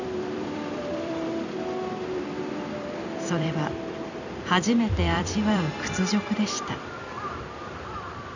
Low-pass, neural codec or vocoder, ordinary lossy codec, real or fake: 7.2 kHz; vocoder, 44.1 kHz, 128 mel bands every 256 samples, BigVGAN v2; none; fake